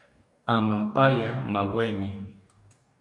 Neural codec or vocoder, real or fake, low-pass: codec, 44.1 kHz, 2.6 kbps, DAC; fake; 10.8 kHz